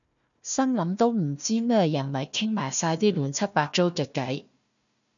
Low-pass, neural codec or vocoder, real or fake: 7.2 kHz; codec, 16 kHz, 1 kbps, FunCodec, trained on Chinese and English, 50 frames a second; fake